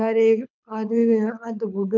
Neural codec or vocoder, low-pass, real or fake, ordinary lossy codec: codec, 24 kHz, 6 kbps, HILCodec; 7.2 kHz; fake; none